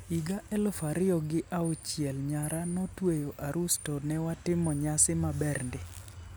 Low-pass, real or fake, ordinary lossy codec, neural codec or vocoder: none; real; none; none